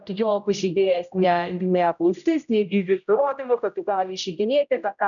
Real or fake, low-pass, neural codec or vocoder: fake; 7.2 kHz; codec, 16 kHz, 0.5 kbps, X-Codec, HuBERT features, trained on general audio